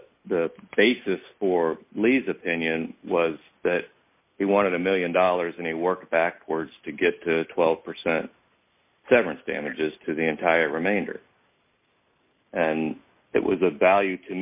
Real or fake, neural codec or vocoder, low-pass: real; none; 3.6 kHz